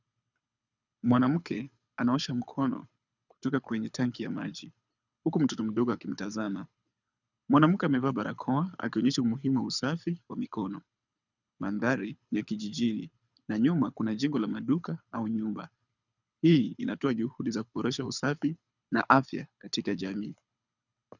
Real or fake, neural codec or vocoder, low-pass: fake; codec, 24 kHz, 6 kbps, HILCodec; 7.2 kHz